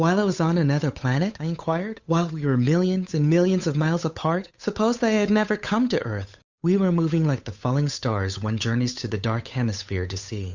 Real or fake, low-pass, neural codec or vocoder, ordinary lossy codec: fake; 7.2 kHz; codec, 16 kHz, 8 kbps, FunCodec, trained on Chinese and English, 25 frames a second; Opus, 64 kbps